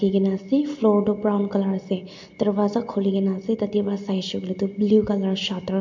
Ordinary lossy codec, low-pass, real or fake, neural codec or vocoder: MP3, 48 kbps; 7.2 kHz; real; none